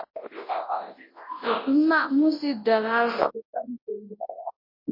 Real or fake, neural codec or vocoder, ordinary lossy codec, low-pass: fake; codec, 24 kHz, 0.9 kbps, WavTokenizer, large speech release; MP3, 24 kbps; 5.4 kHz